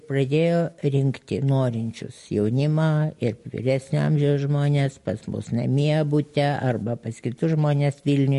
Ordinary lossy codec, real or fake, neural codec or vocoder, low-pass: MP3, 48 kbps; real; none; 14.4 kHz